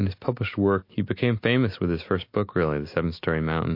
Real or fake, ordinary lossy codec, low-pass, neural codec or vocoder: real; MP3, 32 kbps; 5.4 kHz; none